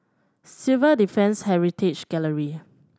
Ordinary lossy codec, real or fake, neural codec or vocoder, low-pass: none; real; none; none